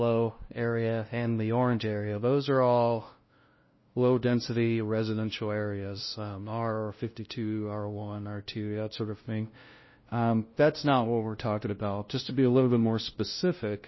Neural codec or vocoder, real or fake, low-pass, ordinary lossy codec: codec, 16 kHz, 0.5 kbps, FunCodec, trained on LibriTTS, 25 frames a second; fake; 7.2 kHz; MP3, 24 kbps